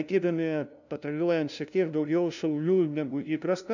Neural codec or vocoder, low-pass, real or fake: codec, 16 kHz, 0.5 kbps, FunCodec, trained on LibriTTS, 25 frames a second; 7.2 kHz; fake